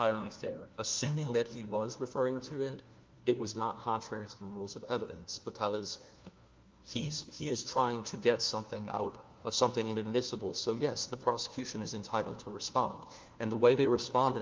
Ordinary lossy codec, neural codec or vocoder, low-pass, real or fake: Opus, 32 kbps; codec, 16 kHz, 1 kbps, FunCodec, trained on LibriTTS, 50 frames a second; 7.2 kHz; fake